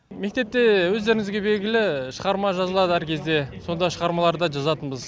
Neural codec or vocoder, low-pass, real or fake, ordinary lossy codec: none; none; real; none